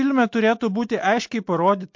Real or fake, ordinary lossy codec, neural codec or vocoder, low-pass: real; MP3, 48 kbps; none; 7.2 kHz